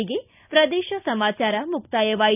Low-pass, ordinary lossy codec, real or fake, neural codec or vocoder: 3.6 kHz; none; real; none